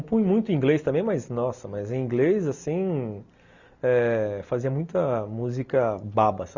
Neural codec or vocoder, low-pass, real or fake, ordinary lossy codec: none; 7.2 kHz; real; Opus, 64 kbps